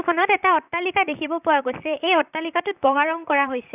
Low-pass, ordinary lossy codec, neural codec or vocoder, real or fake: 3.6 kHz; none; vocoder, 44.1 kHz, 128 mel bands, Pupu-Vocoder; fake